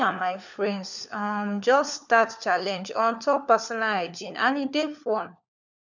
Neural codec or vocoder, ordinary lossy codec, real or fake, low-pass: codec, 16 kHz, 4 kbps, FunCodec, trained on LibriTTS, 50 frames a second; none; fake; 7.2 kHz